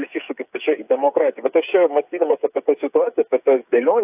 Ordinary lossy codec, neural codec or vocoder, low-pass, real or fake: AAC, 32 kbps; codec, 16 kHz, 8 kbps, FreqCodec, smaller model; 3.6 kHz; fake